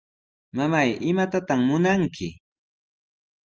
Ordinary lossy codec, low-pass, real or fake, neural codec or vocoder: Opus, 24 kbps; 7.2 kHz; real; none